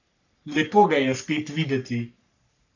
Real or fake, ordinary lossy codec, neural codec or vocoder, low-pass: fake; none; codec, 44.1 kHz, 3.4 kbps, Pupu-Codec; 7.2 kHz